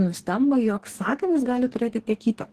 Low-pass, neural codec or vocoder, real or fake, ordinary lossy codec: 14.4 kHz; codec, 44.1 kHz, 2.6 kbps, DAC; fake; Opus, 16 kbps